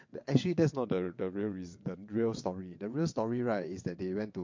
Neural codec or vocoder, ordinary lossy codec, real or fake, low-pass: vocoder, 22.05 kHz, 80 mel bands, WaveNeXt; MP3, 48 kbps; fake; 7.2 kHz